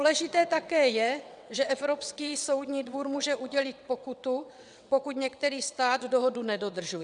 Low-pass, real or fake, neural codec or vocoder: 9.9 kHz; fake; vocoder, 22.05 kHz, 80 mel bands, WaveNeXt